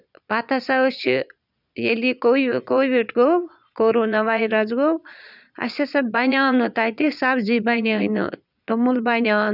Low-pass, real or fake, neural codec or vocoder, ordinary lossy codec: 5.4 kHz; fake; vocoder, 22.05 kHz, 80 mel bands, Vocos; none